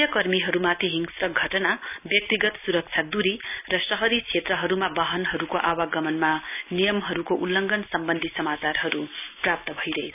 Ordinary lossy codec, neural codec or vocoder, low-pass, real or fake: none; none; 3.6 kHz; real